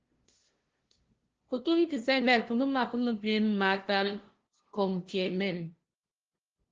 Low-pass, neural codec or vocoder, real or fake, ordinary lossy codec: 7.2 kHz; codec, 16 kHz, 0.5 kbps, FunCodec, trained on LibriTTS, 25 frames a second; fake; Opus, 16 kbps